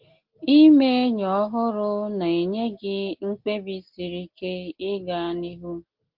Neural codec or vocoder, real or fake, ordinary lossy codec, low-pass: none; real; Opus, 16 kbps; 5.4 kHz